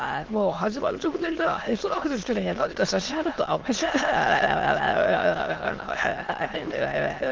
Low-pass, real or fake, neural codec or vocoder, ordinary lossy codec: 7.2 kHz; fake; autoencoder, 22.05 kHz, a latent of 192 numbers a frame, VITS, trained on many speakers; Opus, 32 kbps